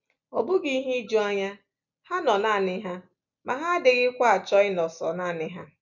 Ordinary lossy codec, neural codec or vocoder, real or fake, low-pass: none; none; real; 7.2 kHz